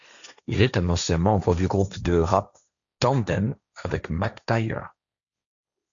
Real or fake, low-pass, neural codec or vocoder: fake; 7.2 kHz; codec, 16 kHz, 1.1 kbps, Voila-Tokenizer